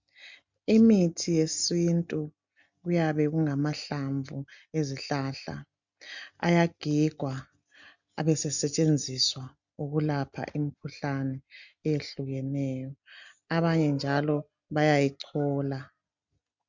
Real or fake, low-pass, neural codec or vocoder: real; 7.2 kHz; none